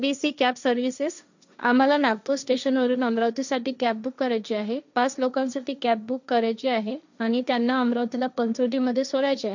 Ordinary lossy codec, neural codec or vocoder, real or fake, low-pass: none; codec, 16 kHz, 1.1 kbps, Voila-Tokenizer; fake; 7.2 kHz